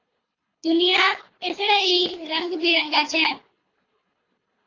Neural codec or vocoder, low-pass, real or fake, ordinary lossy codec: codec, 24 kHz, 3 kbps, HILCodec; 7.2 kHz; fake; AAC, 32 kbps